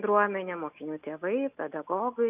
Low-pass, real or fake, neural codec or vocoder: 3.6 kHz; real; none